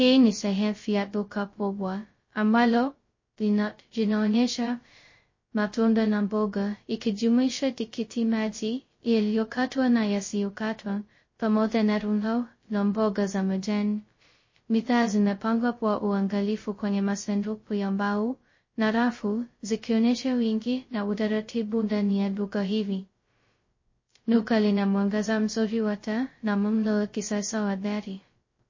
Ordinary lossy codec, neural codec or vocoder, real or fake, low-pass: MP3, 32 kbps; codec, 16 kHz, 0.2 kbps, FocalCodec; fake; 7.2 kHz